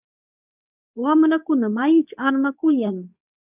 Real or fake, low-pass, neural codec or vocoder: fake; 3.6 kHz; codec, 24 kHz, 0.9 kbps, WavTokenizer, medium speech release version 2